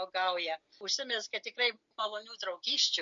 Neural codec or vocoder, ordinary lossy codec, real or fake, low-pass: none; MP3, 48 kbps; real; 7.2 kHz